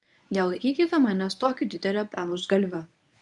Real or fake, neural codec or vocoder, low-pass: fake; codec, 24 kHz, 0.9 kbps, WavTokenizer, medium speech release version 1; 10.8 kHz